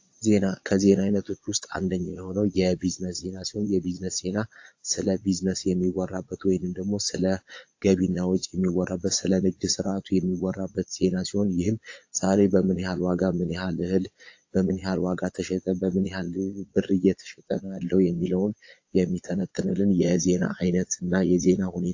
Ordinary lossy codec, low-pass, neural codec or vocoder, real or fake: AAC, 48 kbps; 7.2 kHz; vocoder, 22.05 kHz, 80 mel bands, Vocos; fake